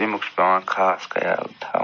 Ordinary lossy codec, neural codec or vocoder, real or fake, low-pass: none; none; real; 7.2 kHz